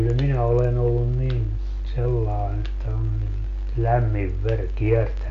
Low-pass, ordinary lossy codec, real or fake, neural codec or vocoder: 7.2 kHz; none; real; none